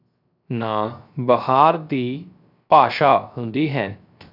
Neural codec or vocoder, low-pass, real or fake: codec, 16 kHz, 0.3 kbps, FocalCodec; 5.4 kHz; fake